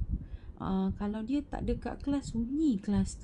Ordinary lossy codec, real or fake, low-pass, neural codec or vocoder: none; fake; 10.8 kHz; vocoder, 24 kHz, 100 mel bands, Vocos